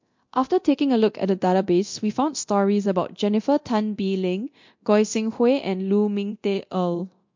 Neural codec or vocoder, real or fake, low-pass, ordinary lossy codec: codec, 24 kHz, 0.9 kbps, DualCodec; fake; 7.2 kHz; MP3, 48 kbps